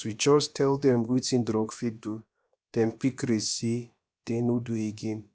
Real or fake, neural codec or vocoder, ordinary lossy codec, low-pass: fake; codec, 16 kHz, about 1 kbps, DyCAST, with the encoder's durations; none; none